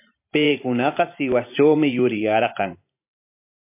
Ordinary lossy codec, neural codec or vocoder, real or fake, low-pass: MP3, 24 kbps; vocoder, 44.1 kHz, 128 mel bands every 512 samples, BigVGAN v2; fake; 3.6 kHz